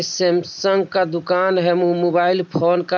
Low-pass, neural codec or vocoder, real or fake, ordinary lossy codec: none; none; real; none